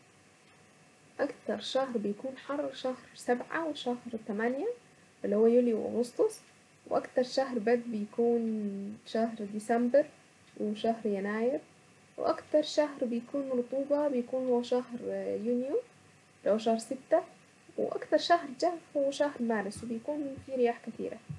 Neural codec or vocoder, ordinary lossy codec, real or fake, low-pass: none; none; real; none